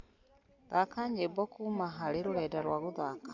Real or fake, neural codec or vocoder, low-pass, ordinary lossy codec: real; none; 7.2 kHz; none